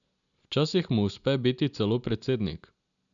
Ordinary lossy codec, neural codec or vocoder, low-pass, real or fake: none; none; 7.2 kHz; real